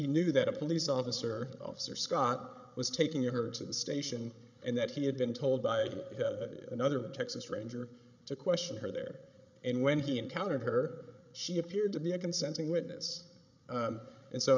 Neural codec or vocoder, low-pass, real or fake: codec, 16 kHz, 16 kbps, FreqCodec, larger model; 7.2 kHz; fake